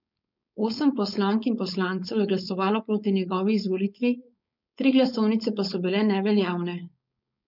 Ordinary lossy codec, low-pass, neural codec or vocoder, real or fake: none; 5.4 kHz; codec, 16 kHz, 4.8 kbps, FACodec; fake